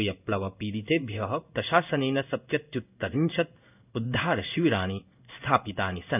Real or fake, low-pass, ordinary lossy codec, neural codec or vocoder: fake; 3.6 kHz; none; codec, 16 kHz in and 24 kHz out, 1 kbps, XY-Tokenizer